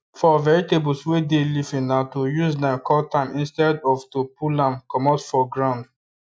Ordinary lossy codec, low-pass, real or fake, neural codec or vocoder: none; none; real; none